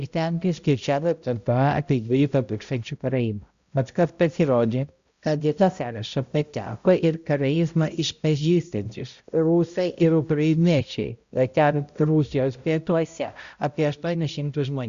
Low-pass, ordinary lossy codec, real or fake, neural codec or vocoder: 7.2 kHz; Opus, 64 kbps; fake; codec, 16 kHz, 0.5 kbps, X-Codec, HuBERT features, trained on balanced general audio